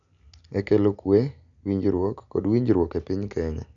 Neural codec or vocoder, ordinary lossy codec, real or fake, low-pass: none; none; real; 7.2 kHz